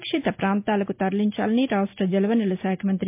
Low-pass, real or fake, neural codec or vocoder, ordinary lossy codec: 3.6 kHz; real; none; MP3, 32 kbps